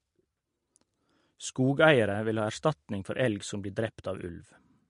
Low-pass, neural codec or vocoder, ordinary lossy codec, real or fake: 10.8 kHz; none; MP3, 48 kbps; real